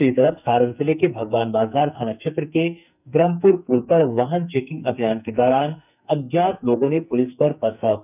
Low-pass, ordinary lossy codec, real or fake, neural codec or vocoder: 3.6 kHz; none; fake; codec, 44.1 kHz, 2.6 kbps, SNAC